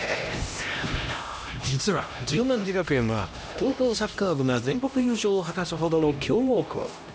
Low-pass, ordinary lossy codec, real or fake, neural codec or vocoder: none; none; fake; codec, 16 kHz, 1 kbps, X-Codec, HuBERT features, trained on LibriSpeech